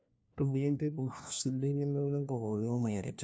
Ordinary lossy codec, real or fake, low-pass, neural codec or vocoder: none; fake; none; codec, 16 kHz, 1 kbps, FunCodec, trained on LibriTTS, 50 frames a second